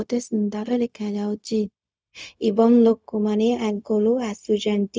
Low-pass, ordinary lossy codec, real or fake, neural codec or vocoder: none; none; fake; codec, 16 kHz, 0.4 kbps, LongCat-Audio-Codec